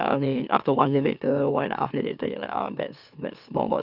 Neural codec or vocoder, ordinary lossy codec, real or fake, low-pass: autoencoder, 44.1 kHz, a latent of 192 numbers a frame, MeloTTS; none; fake; 5.4 kHz